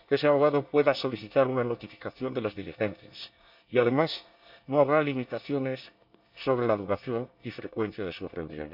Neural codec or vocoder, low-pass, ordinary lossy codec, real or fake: codec, 24 kHz, 1 kbps, SNAC; 5.4 kHz; none; fake